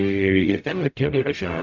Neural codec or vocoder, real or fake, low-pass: codec, 44.1 kHz, 0.9 kbps, DAC; fake; 7.2 kHz